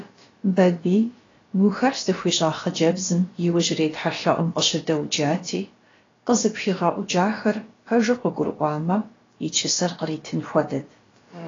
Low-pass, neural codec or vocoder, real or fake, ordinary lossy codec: 7.2 kHz; codec, 16 kHz, about 1 kbps, DyCAST, with the encoder's durations; fake; AAC, 32 kbps